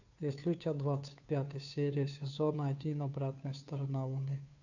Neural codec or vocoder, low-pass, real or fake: codec, 16 kHz, 2 kbps, FunCodec, trained on Chinese and English, 25 frames a second; 7.2 kHz; fake